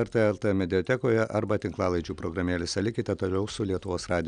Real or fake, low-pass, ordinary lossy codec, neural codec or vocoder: real; 9.9 kHz; Opus, 64 kbps; none